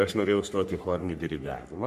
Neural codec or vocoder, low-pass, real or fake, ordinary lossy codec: codec, 44.1 kHz, 3.4 kbps, Pupu-Codec; 14.4 kHz; fake; MP3, 64 kbps